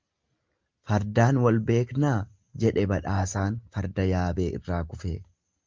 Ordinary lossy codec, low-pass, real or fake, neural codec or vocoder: Opus, 24 kbps; 7.2 kHz; real; none